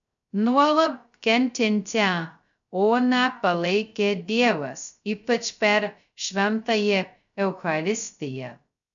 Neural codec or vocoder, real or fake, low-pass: codec, 16 kHz, 0.2 kbps, FocalCodec; fake; 7.2 kHz